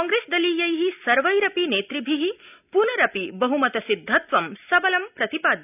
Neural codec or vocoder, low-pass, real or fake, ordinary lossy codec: none; 3.6 kHz; real; none